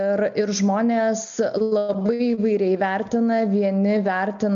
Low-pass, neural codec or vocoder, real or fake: 7.2 kHz; none; real